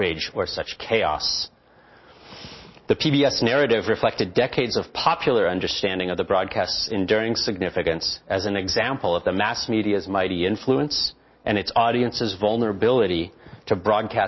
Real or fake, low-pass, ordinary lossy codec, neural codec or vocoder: real; 7.2 kHz; MP3, 24 kbps; none